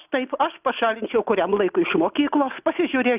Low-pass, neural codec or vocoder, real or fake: 3.6 kHz; none; real